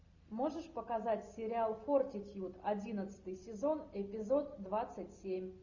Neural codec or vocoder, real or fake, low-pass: none; real; 7.2 kHz